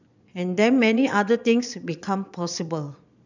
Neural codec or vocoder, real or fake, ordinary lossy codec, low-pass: vocoder, 22.05 kHz, 80 mel bands, Vocos; fake; none; 7.2 kHz